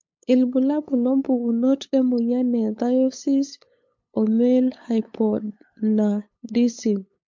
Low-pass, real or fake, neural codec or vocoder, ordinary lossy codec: 7.2 kHz; fake; codec, 16 kHz, 8 kbps, FunCodec, trained on LibriTTS, 25 frames a second; MP3, 48 kbps